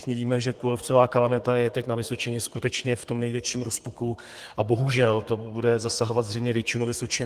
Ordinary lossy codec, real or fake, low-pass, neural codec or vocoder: Opus, 24 kbps; fake; 14.4 kHz; codec, 32 kHz, 1.9 kbps, SNAC